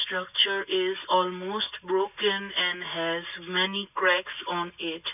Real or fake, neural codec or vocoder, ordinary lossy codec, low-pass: real; none; none; 3.6 kHz